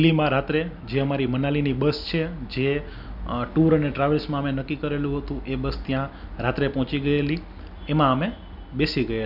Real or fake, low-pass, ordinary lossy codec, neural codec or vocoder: real; 5.4 kHz; none; none